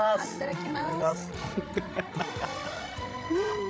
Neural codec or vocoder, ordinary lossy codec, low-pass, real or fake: codec, 16 kHz, 16 kbps, FreqCodec, larger model; none; none; fake